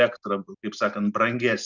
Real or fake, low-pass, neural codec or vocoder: real; 7.2 kHz; none